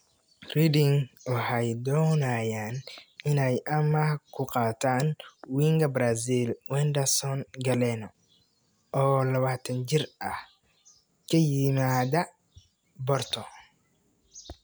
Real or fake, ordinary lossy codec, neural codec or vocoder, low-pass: real; none; none; none